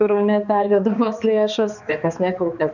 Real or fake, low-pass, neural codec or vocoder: fake; 7.2 kHz; codec, 16 kHz, 2 kbps, X-Codec, HuBERT features, trained on balanced general audio